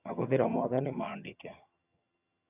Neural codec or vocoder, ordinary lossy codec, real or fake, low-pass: vocoder, 22.05 kHz, 80 mel bands, HiFi-GAN; none; fake; 3.6 kHz